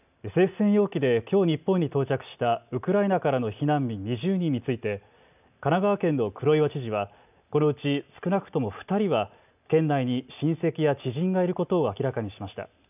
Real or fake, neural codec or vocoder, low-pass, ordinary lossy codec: real; none; 3.6 kHz; none